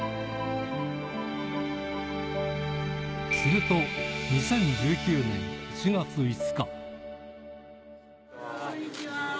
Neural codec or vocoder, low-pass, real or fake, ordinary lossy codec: none; none; real; none